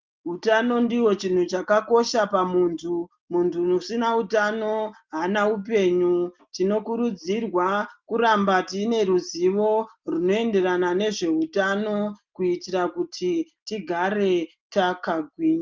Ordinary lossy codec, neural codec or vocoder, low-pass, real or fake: Opus, 32 kbps; none; 7.2 kHz; real